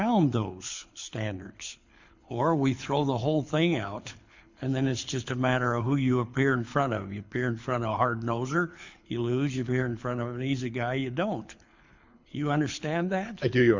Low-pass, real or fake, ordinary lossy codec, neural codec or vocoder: 7.2 kHz; fake; MP3, 64 kbps; codec, 24 kHz, 6 kbps, HILCodec